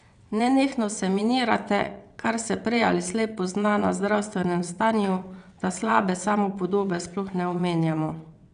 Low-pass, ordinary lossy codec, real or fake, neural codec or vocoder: 9.9 kHz; none; fake; vocoder, 22.05 kHz, 80 mel bands, WaveNeXt